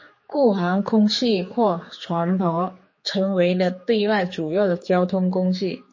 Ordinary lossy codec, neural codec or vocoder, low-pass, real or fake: MP3, 32 kbps; codec, 16 kHz, 4 kbps, X-Codec, HuBERT features, trained on general audio; 7.2 kHz; fake